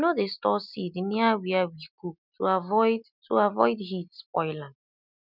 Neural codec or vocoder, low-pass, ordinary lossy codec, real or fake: none; 5.4 kHz; none; real